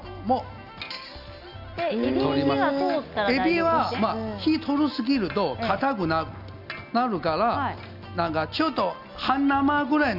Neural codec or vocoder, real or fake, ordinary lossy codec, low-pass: none; real; none; 5.4 kHz